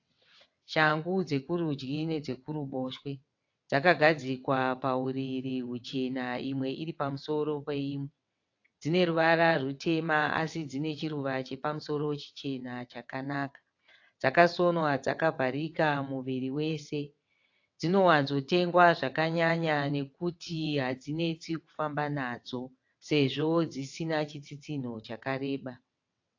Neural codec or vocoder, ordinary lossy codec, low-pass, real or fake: vocoder, 22.05 kHz, 80 mel bands, WaveNeXt; AAC, 48 kbps; 7.2 kHz; fake